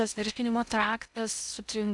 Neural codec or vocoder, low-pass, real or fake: codec, 16 kHz in and 24 kHz out, 0.6 kbps, FocalCodec, streaming, 2048 codes; 10.8 kHz; fake